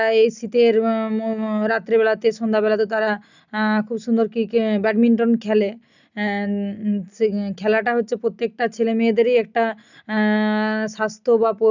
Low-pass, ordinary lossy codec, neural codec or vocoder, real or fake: 7.2 kHz; none; none; real